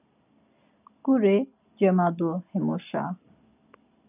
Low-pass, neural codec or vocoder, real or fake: 3.6 kHz; none; real